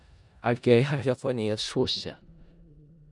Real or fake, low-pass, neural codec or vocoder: fake; 10.8 kHz; codec, 16 kHz in and 24 kHz out, 0.4 kbps, LongCat-Audio-Codec, four codebook decoder